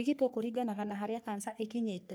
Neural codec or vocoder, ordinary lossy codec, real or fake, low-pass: codec, 44.1 kHz, 3.4 kbps, Pupu-Codec; none; fake; none